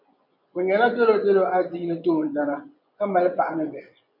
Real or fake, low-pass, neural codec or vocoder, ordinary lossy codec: fake; 5.4 kHz; vocoder, 24 kHz, 100 mel bands, Vocos; MP3, 48 kbps